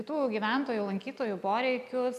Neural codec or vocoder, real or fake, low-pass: none; real; 14.4 kHz